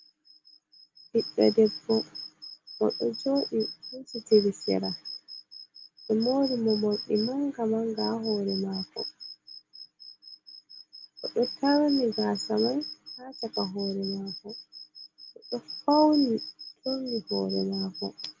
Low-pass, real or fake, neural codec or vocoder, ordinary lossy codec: 7.2 kHz; real; none; Opus, 32 kbps